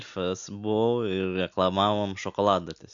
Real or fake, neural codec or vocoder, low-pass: real; none; 7.2 kHz